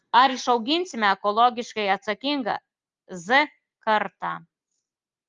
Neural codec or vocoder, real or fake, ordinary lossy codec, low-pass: none; real; Opus, 32 kbps; 7.2 kHz